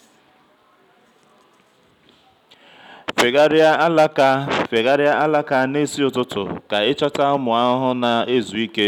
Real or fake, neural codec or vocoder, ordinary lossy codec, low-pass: real; none; none; 19.8 kHz